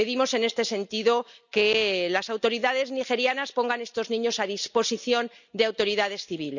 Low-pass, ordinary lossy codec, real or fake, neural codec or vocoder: 7.2 kHz; none; real; none